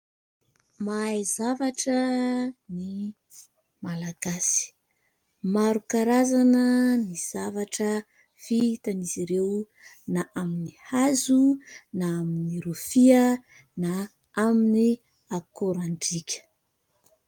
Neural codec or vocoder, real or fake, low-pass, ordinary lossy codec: none; real; 19.8 kHz; Opus, 32 kbps